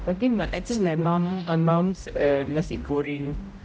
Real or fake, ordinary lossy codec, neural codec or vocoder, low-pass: fake; none; codec, 16 kHz, 0.5 kbps, X-Codec, HuBERT features, trained on general audio; none